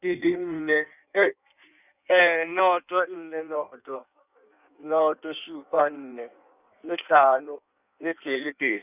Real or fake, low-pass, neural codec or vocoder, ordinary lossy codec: fake; 3.6 kHz; codec, 16 kHz in and 24 kHz out, 1.1 kbps, FireRedTTS-2 codec; none